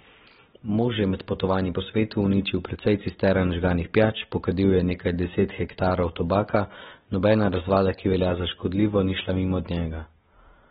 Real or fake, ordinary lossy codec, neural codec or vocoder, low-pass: real; AAC, 16 kbps; none; 19.8 kHz